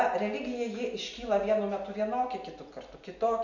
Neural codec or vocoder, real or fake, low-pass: none; real; 7.2 kHz